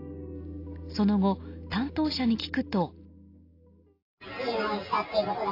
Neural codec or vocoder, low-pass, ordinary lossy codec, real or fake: none; 5.4 kHz; AAC, 32 kbps; real